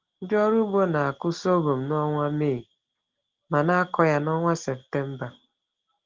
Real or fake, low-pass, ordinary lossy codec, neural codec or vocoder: real; 7.2 kHz; Opus, 16 kbps; none